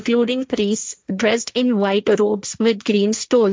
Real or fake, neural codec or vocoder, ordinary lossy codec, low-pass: fake; codec, 16 kHz, 1.1 kbps, Voila-Tokenizer; none; none